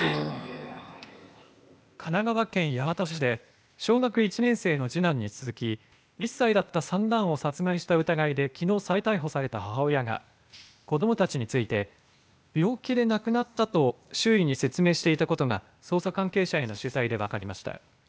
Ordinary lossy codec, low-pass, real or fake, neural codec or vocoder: none; none; fake; codec, 16 kHz, 0.8 kbps, ZipCodec